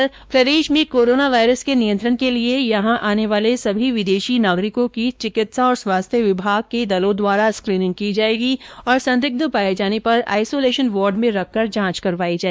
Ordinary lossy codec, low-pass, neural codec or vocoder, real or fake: none; none; codec, 16 kHz, 2 kbps, X-Codec, WavLM features, trained on Multilingual LibriSpeech; fake